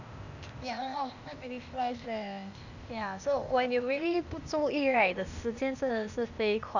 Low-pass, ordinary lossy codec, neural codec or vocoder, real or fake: 7.2 kHz; none; codec, 16 kHz, 0.8 kbps, ZipCodec; fake